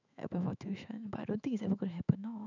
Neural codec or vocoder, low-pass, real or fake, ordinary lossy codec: autoencoder, 48 kHz, 128 numbers a frame, DAC-VAE, trained on Japanese speech; 7.2 kHz; fake; none